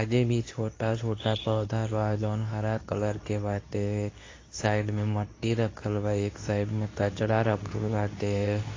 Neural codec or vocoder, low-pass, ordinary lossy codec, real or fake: codec, 24 kHz, 0.9 kbps, WavTokenizer, medium speech release version 2; 7.2 kHz; AAC, 32 kbps; fake